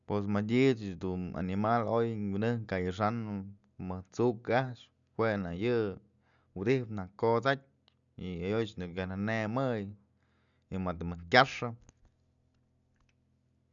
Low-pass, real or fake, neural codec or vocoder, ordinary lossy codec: 7.2 kHz; real; none; none